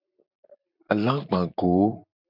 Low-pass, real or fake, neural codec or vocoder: 5.4 kHz; fake; vocoder, 44.1 kHz, 128 mel bands every 256 samples, BigVGAN v2